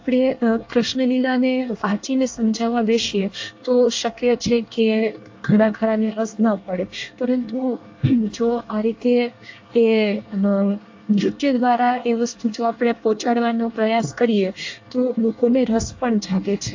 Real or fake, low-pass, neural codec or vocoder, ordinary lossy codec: fake; 7.2 kHz; codec, 24 kHz, 1 kbps, SNAC; AAC, 48 kbps